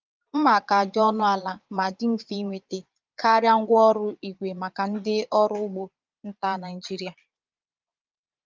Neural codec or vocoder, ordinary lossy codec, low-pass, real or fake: vocoder, 22.05 kHz, 80 mel bands, Vocos; Opus, 24 kbps; 7.2 kHz; fake